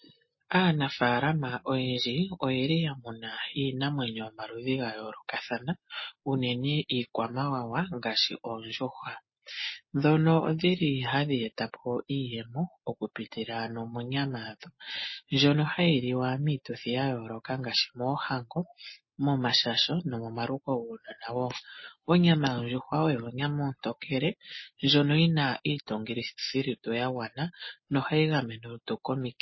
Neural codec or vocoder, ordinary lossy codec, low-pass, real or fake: none; MP3, 24 kbps; 7.2 kHz; real